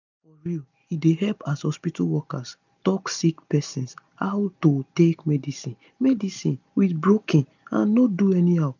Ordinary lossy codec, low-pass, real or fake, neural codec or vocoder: none; 7.2 kHz; real; none